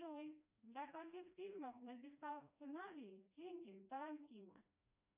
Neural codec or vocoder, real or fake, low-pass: codec, 16 kHz, 1 kbps, FreqCodec, smaller model; fake; 3.6 kHz